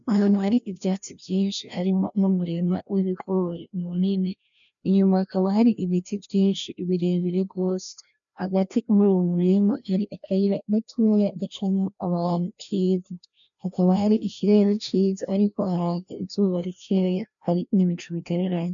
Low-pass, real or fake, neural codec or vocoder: 7.2 kHz; fake; codec, 16 kHz, 1 kbps, FreqCodec, larger model